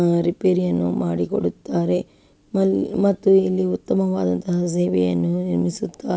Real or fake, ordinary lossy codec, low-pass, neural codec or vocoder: real; none; none; none